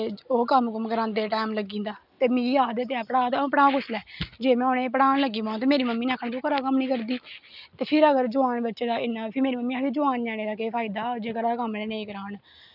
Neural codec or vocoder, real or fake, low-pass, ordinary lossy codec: none; real; 5.4 kHz; none